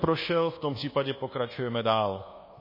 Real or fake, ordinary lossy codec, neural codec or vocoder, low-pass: fake; MP3, 24 kbps; codec, 24 kHz, 1.2 kbps, DualCodec; 5.4 kHz